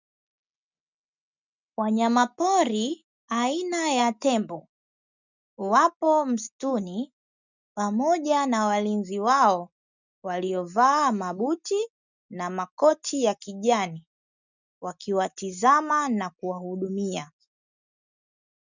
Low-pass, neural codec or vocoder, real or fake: 7.2 kHz; none; real